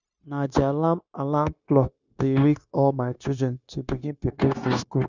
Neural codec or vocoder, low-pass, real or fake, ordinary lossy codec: codec, 16 kHz, 0.9 kbps, LongCat-Audio-Codec; 7.2 kHz; fake; none